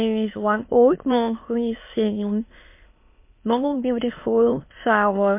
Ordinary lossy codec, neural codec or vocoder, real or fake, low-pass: MP3, 24 kbps; autoencoder, 22.05 kHz, a latent of 192 numbers a frame, VITS, trained on many speakers; fake; 3.6 kHz